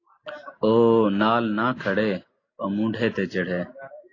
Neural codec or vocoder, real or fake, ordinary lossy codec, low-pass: none; real; AAC, 32 kbps; 7.2 kHz